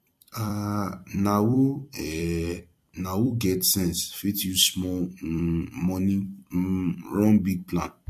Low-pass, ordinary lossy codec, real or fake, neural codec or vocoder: 14.4 kHz; MP3, 64 kbps; fake; vocoder, 44.1 kHz, 128 mel bands every 256 samples, BigVGAN v2